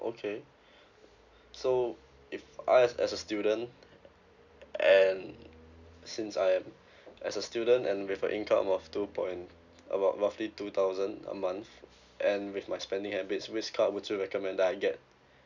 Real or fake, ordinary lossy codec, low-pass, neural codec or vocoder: real; none; 7.2 kHz; none